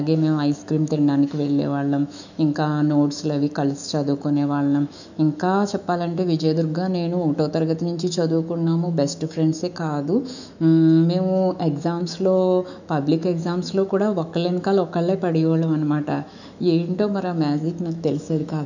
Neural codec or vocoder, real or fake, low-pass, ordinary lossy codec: autoencoder, 48 kHz, 128 numbers a frame, DAC-VAE, trained on Japanese speech; fake; 7.2 kHz; none